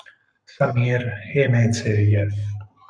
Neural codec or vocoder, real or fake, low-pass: codec, 44.1 kHz, 7.8 kbps, Pupu-Codec; fake; 9.9 kHz